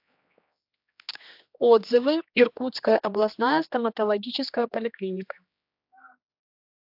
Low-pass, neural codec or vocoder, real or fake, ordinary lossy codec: 5.4 kHz; codec, 16 kHz, 2 kbps, X-Codec, HuBERT features, trained on general audio; fake; AAC, 48 kbps